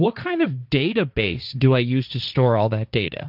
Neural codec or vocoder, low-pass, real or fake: codec, 16 kHz, 1.1 kbps, Voila-Tokenizer; 5.4 kHz; fake